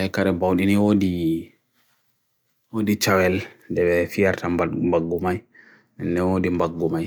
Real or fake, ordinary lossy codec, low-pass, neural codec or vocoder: real; none; none; none